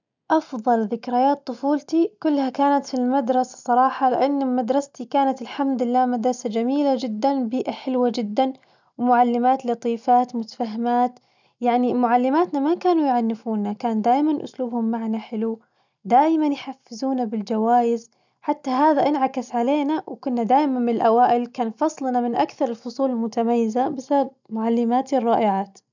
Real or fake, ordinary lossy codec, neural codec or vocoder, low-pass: real; none; none; 7.2 kHz